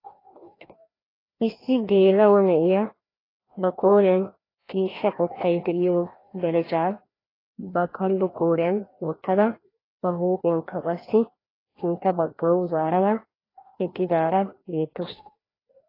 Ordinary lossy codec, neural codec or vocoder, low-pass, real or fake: AAC, 24 kbps; codec, 16 kHz, 1 kbps, FreqCodec, larger model; 5.4 kHz; fake